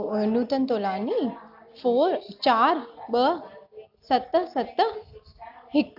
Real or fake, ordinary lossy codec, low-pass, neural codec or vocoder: real; AAC, 48 kbps; 5.4 kHz; none